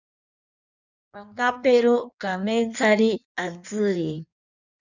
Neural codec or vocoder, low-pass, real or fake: codec, 16 kHz in and 24 kHz out, 1.1 kbps, FireRedTTS-2 codec; 7.2 kHz; fake